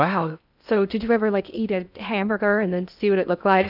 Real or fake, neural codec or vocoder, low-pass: fake; codec, 16 kHz in and 24 kHz out, 0.8 kbps, FocalCodec, streaming, 65536 codes; 5.4 kHz